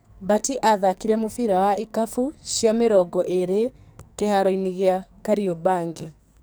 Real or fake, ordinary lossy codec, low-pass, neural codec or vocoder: fake; none; none; codec, 44.1 kHz, 2.6 kbps, SNAC